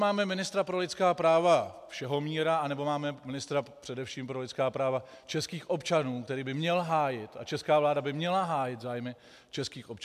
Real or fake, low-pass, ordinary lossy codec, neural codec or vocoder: real; 14.4 kHz; MP3, 96 kbps; none